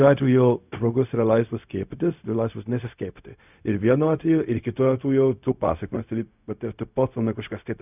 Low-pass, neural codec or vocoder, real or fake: 3.6 kHz; codec, 16 kHz, 0.4 kbps, LongCat-Audio-Codec; fake